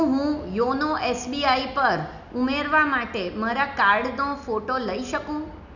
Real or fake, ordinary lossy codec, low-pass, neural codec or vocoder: real; none; 7.2 kHz; none